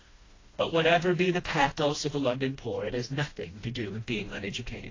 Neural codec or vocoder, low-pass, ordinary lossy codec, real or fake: codec, 16 kHz, 1 kbps, FreqCodec, smaller model; 7.2 kHz; AAC, 32 kbps; fake